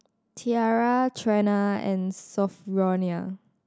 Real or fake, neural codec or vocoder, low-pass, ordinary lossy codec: real; none; none; none